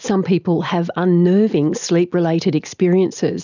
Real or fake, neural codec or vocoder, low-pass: real; none; 7.2 kHz